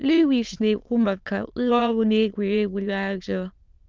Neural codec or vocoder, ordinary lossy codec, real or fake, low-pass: autoencoder, 22.05 kHz, a latent of 192 numbers a frame, VITS, trained on many speakers; Opus, 32 kbps; fake; 7.2 kHz